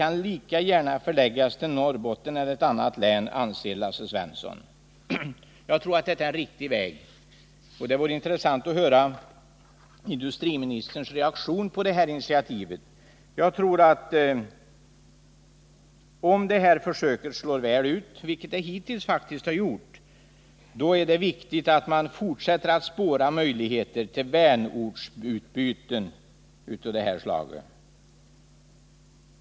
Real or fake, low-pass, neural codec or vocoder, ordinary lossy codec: real; none; none; none